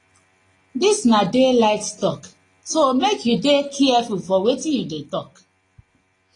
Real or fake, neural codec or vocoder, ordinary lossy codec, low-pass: real; none; AAC, 32 kbps; 10.8 kHz